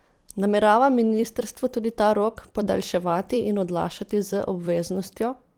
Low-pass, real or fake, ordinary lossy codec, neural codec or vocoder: 19.8 kHz; fake; Opus, 24 kbps; vocoder, 44.1 kHz, 128 mel bands every 256 samples, BigVGAN v2